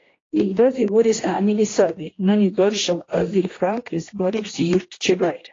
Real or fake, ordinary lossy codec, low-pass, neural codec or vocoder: fake; AAC, 32 kbps; 7.2 kHz; codec, 16 kHz, 1 kbps, X-Codec, HuBERT features, trained on general audio